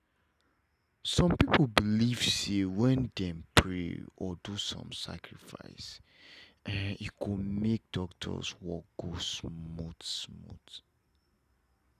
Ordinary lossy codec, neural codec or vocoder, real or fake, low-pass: none; none; real; 14.4 kHz